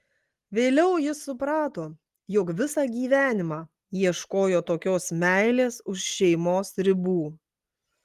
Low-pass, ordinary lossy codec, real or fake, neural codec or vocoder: 14.4 kHz; Opus, 24 kbps; real; none